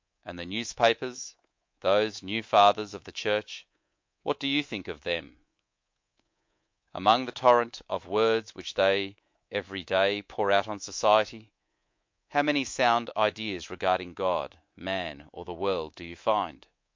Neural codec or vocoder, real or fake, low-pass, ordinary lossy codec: codec, 24 kHz, 3.1 kbps, DualCodec; fake; 7.2 kHz; MP3, 48 kbps